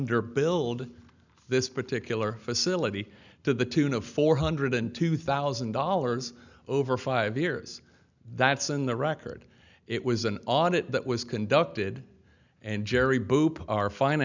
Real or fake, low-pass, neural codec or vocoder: real; 7.2 kHz; none